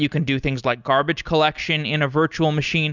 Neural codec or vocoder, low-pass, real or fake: none; 7.2 kHz; real